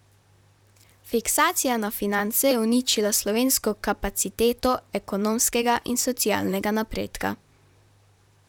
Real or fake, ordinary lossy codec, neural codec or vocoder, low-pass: fake; none; vocoder, 44.1 kHz, 128 mel bands, Pupu-Vocoder; 19.8 kHz